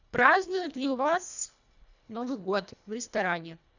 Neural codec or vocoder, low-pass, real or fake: codec, 24 kHz, 1.5 kbps, HILCodec; 7.2 kHz; fake